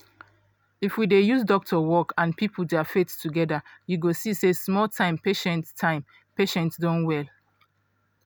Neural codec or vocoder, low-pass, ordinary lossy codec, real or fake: none; none; none; real